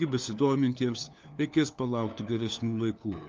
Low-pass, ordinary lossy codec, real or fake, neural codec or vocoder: 7.2 kHz; Opus, 24 kbps; fake; codec, 16 kHz, 4 kbps, FunCodec, trained on LibriTTS, 50 frames a second